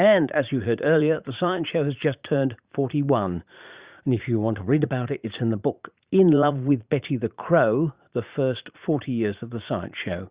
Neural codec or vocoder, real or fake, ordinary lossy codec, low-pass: vocoder, 44.1 kHz, 80 mel bands, Vocos; fake; Opus, 64 kbps; 3.6 kHz